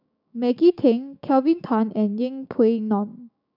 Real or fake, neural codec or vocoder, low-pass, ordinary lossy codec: fake; codec, 16 kHz, 6 kbps, DAC; 5.4 kHz; none